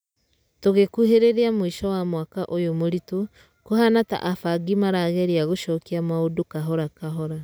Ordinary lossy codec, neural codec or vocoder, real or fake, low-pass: none; none; real; none